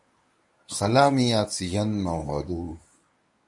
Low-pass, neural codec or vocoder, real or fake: 10.8 kHz; codec, 24 kHz, 0.9 kbps, WavTokenizer, medium speech release version 1; fake